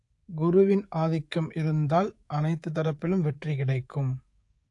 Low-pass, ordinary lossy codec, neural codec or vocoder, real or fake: 10.8 kHz; AAC, 48 kbps; codec, 24 kHz, 3.1 kbps, DualCodec; fake